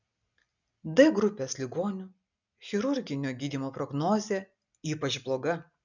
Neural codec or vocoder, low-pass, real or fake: none; 7.2 kHz; real